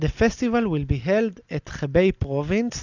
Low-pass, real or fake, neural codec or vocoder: 7.2 kHz; real; none